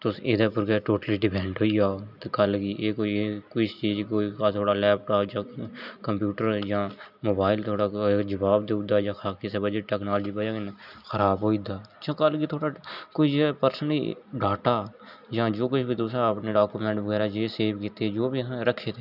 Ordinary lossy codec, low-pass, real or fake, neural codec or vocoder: none; 5.4 kHz; real; none